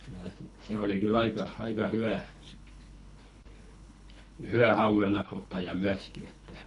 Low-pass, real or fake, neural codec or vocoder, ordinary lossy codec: 10.8 kHz; fake; codec, 24 kHz, 3 kbps, HILCodec; none